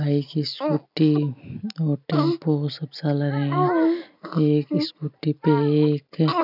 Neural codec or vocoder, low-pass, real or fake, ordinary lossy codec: none; 5.4 kHz; real; none